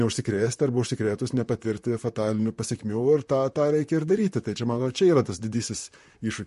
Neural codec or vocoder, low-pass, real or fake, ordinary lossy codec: vocoder, 44.1 kHz, 128 mel bands, Pupu-Vocoder; 14.4 kHz; fake; MP3, 48 kbps